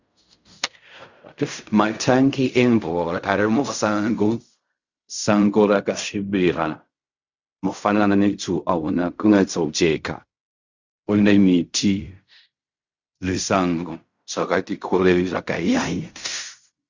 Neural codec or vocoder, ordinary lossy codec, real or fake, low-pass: codec, 16 kHz in and 24 kHz out, 0.4 kbps, LongCat-Audio-Codec, fine tuned four codebook decoder; Opus, 64 kbps; fake; 7.2 kHz